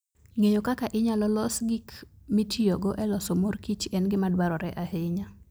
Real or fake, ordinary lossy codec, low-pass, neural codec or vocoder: real; none; none; none